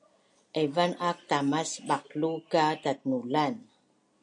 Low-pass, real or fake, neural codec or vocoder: 9.9 kHz; real; none